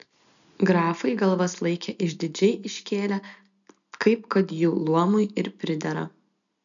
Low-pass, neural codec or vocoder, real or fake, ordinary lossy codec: 7.2 kHz; none; real; MP3, 96 kbps